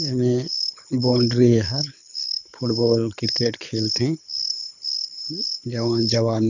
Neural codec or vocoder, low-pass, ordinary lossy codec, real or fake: codec, 24 kHz, 6 kbps, HILCodec; 7.2 kHz; none; fake